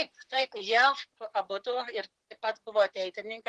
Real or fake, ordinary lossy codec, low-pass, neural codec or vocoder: fake; Opus, 16 kbps; 10.8 kHz; vocoder, 44.1 kHz, 128 mel bands, Pupu-Vocoder